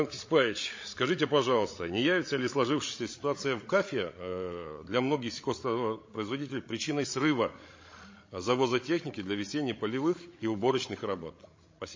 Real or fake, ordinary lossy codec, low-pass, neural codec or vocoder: fake; MP3, 32 kbps; 7.2 kHz; codec, 16 kHz, 16 kbps, FreqCodec, larger model